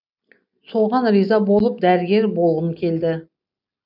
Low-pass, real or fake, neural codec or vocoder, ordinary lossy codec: 5.4 kHz; real; none; none